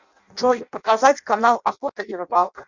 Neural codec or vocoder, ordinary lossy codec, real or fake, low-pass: codec, 16 kHz in and 24 kHz out, 0.6 kbps, FireRedTTS-2 codec; Opus, 64 kbps; fake; 7.2 kHz